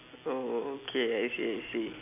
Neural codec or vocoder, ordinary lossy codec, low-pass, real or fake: none; none; 3.6 kHz; real